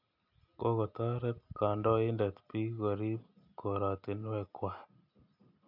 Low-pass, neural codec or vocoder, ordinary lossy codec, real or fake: 5.4 kHz; none; none; real